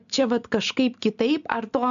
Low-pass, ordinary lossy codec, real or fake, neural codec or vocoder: 7.2 kHz; AAC, 96 kbps; real; none